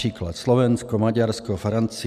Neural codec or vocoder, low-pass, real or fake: none; 14.4 kHz; real